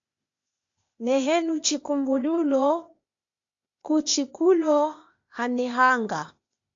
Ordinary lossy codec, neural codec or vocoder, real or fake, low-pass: AAC, 64 kbps; codec, 16 kHz, 0.8 kbps, ZipCodec; fake; 7.2 kHz